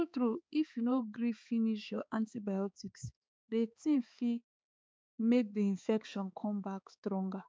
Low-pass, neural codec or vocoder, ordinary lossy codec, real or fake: none; codec, 16 kHz, 4 kbps, X-Codec, HuBERT features, trained on balanced general audio; none; fake